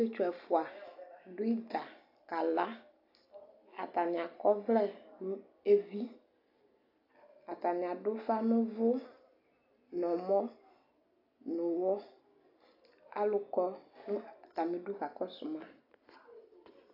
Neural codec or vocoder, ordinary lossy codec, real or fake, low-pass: none; AAC, 32 kbps; real; 5.4 kHz